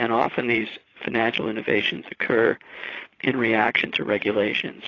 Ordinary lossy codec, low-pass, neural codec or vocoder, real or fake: AAC, 32 kbps; 7.2 kHz; vocoder, 22.05 kHz, 80 mel bands, Vocos; fake